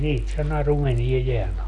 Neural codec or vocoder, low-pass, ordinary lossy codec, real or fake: none; 10.8 kHz; none; real